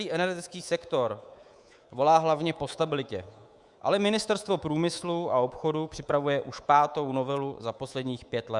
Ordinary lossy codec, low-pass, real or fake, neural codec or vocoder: Opus, 64 kbps; 10.8 kHz; fake; codec, 24 kHz, 3.1 kbps, DualCodec